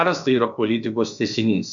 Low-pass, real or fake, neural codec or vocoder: 7.2 kHz; fake; codec, 16 kHz, about 1 kbps, DyCAST, with the encoder's durations